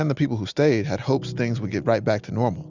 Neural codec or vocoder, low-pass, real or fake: none; 7.2 kHz; real